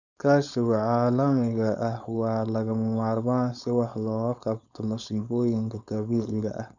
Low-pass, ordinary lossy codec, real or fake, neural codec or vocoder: 7.2 kHz; none; fake; codec, 16 kHz, 4.8 kbps, FACodec